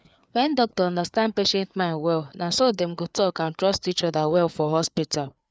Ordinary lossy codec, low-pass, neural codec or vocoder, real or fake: none; none; codec, 16 kHz, 4 kbps, FreqCodec, larger model; fake